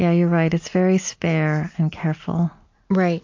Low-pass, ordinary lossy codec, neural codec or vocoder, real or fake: 7.2 kHz; AAC, 48 kbps; none; real